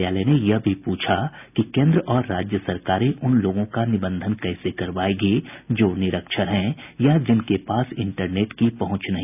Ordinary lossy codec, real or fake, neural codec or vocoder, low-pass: none; real; none; 3.6 kHz